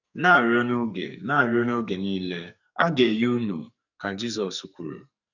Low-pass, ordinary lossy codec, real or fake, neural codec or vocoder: 7.2 kHz; none; fake; codec, 44.1 kHz, 2.6 kbps, SNAC